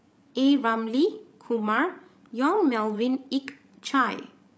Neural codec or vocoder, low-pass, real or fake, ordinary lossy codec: codec, 16 kHz, 16 kbps, FreqCodec, larger model; none; fake; none